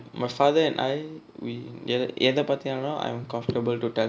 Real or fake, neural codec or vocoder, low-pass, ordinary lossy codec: real; none; none; none